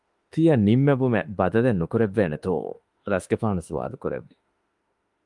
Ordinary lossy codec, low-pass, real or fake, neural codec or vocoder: Opus, 32 kbps; 10.8 kHz; fake; autoencoder, 48 kHz, 32 numbers a frame, DAC-VAE, trained on Japanese speech